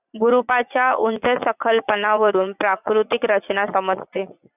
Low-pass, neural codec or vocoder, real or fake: 3.6 kHz; vocoder, 44.1 kHz, 80 mel bands, Vocos; fake